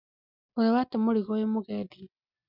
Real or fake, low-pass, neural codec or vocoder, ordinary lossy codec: real; 5.4 kHz; none; none